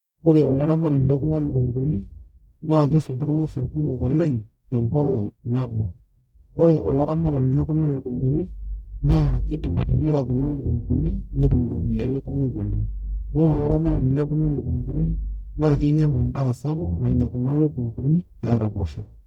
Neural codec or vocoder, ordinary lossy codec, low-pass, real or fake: codec, 44.1 kHz, 0.9 kbps, DAC; none; 19.8 kHz; fake